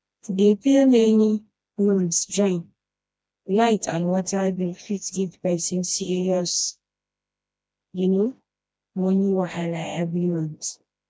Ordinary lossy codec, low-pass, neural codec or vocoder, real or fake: none; none; codec, 16 kHz, 1 kbps, FreqCodec, smaller model; fake